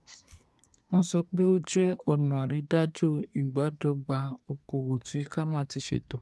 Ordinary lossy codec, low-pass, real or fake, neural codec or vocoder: none; none; fake; codec, 24 kHz, 1 kbps, SNAC